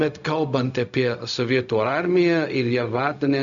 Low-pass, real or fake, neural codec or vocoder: 7.2 kHz; fake; codec, 16 kHz, 0.4 kbps, LongCat-Audio-Codec